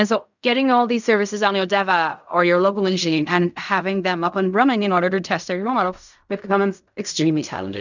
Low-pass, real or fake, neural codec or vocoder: 7.2 kHz; fake; codec, 16 kHz in and 24 kHz out, 0.4 kbps, LongCat-Audio-Codec, fine tuned four codebook decoder